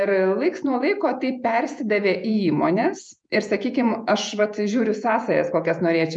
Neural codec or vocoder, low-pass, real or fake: vocoder, 48 kHz, 128 mel bands, Vocos; 9.9 kHz; fake